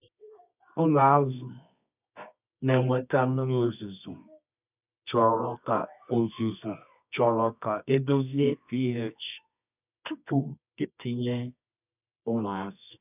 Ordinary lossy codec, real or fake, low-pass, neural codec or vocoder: none; fake; 3.6 kHz; codec, 24 kHz, 0.9 kbps, WavTokenizer, medium music audio release